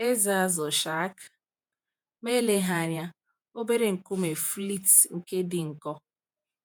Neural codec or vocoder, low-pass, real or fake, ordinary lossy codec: vocoder, 48 kHz, 128 mel bands, Vocos; none; fake; none